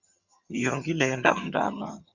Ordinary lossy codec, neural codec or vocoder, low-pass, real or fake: Opus, 64 kbps; vocoder, 22.05 kHz, 80 mel bands, HiFi-GAN; 7.2 kHz; fake